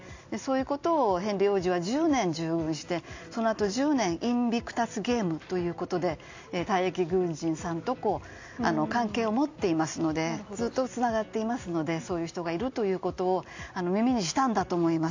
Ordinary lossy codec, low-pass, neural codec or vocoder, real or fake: AAC, 48 kbps; 7.2 kHz; none; real